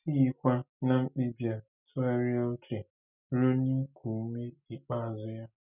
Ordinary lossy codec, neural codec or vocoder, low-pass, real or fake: none; none; 3.6 kHz; real